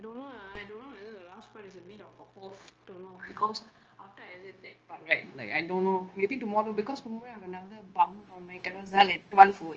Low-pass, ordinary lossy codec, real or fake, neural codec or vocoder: 7.2 kHz; Opus, 24 kbps; fake; codec, 16 kHz, 0.9 kbps, LongCat-Audio-Codec